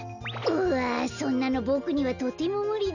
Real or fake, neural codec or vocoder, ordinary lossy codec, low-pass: real; none; none; 7.2 kHz